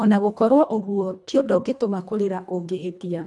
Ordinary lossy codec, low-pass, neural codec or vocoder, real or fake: none; none; codec, 24 kHz, 1.5 kbps, HILCodec; fake